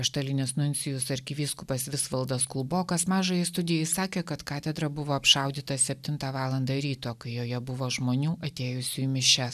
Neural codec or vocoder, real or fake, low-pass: none; real; 14.4 kHz